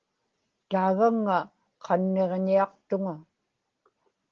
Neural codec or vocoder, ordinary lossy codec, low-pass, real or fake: none; Opus, 16 kbps; 7.2 kHz; real